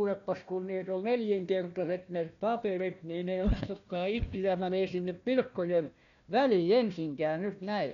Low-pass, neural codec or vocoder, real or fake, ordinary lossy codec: 7.2 kHz; codec, 16 kHz, 1 kbps, FunCodec, trained on Chinese and English, 50 frames a second; fake; none